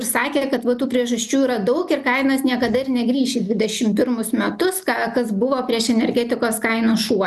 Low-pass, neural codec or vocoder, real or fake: 14.4 kHz; none; real